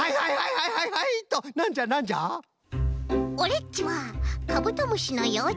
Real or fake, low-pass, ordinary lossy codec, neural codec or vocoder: real; none; none; none